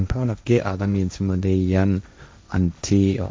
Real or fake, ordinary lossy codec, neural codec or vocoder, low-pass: fake; none; codec, 16 kHz, 1.1 kbps, Voila-Tokenizer; none